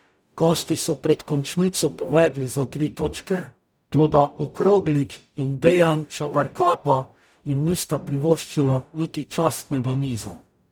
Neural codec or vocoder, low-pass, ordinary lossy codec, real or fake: codec, 44.1 kHz, 0.9 kbps, DAC; none; none; fake